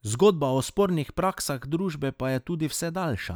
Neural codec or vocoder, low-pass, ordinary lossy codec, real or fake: none; none; none; real